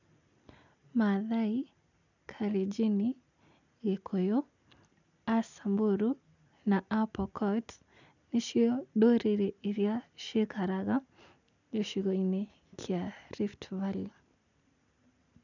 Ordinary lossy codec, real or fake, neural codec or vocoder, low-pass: none; real; none; 7.2 kHz